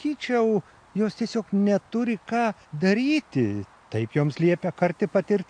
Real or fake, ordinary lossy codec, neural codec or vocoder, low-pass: real; AAC, 64 kbps; none; 9.9 kHz